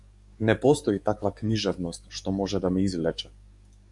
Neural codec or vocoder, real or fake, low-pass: codec, 44.1 kHz, 7.8 kbps, DAC; fake; 10.8 kHz